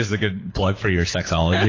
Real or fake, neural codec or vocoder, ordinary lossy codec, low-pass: fake; codec, 24 kHz, 6 kbps, HILCodec; AAC, 32 kbps; 7.2 kHz